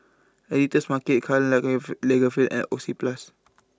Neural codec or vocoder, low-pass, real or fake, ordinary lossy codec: none; none; real; none